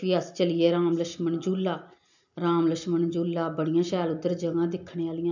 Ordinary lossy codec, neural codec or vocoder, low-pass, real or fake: none; none; 7.2 kHz; real